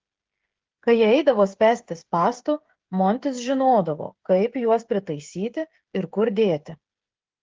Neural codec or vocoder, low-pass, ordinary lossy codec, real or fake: codec, 16 kHz, 16 kbps, FreqCodec, smaller model; 7.2 kHz; Opus, 16 kbps; fake